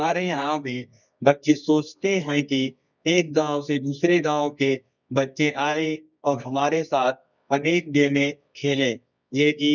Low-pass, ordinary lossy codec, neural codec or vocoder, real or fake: 7.2 kHz; none; codec, 24 kHz, 0.9 kbps, WavTokenizer, medium music audio release; fake